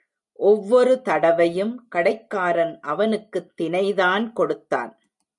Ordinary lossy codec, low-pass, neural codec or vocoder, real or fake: MP3, 96 kbps; 10.8 kHz; none; real